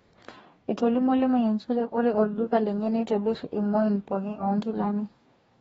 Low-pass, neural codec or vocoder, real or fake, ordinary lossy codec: 19.8 kHz; codec, 44.1 kHz, 2.6 kbps, DAC; fake; AAC, 24 kbps